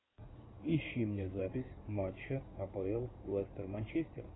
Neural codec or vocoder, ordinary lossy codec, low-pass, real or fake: codec, 16 kHz in and 24 kHz out, 2.2 kbps, FireRedTTS-2 codec; AAC, 16 kbps; 7.2 kHz; fake